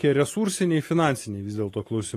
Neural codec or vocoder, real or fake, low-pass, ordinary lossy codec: none; real; 14.4 kHz; AAC, 48 kbps